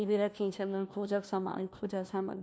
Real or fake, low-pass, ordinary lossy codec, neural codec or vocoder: fake; none; none; codec, 16 kHz, 1 kbps, FunCodec, trained on LibriTTS, 50 frames a second